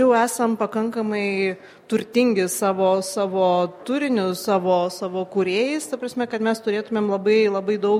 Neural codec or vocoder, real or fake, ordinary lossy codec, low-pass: none; real; MP3, 64 kbps; 19.8 kHz